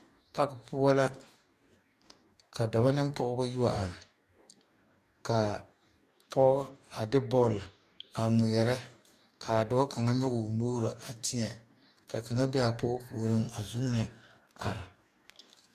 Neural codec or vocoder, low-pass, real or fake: codec, 44.1 kHz, 2.6 kbps, DAC; 14.4 kHz; fake